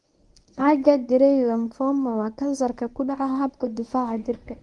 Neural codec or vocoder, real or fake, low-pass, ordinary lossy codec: codec, 24 kHz, 0.9 kbps, WavTokenizer, medium speech release version 1; fake; 10.8 kHz; Opus, 32 kbps